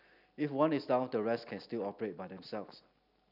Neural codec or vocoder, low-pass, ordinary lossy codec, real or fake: none; 5.4 kHz; none; real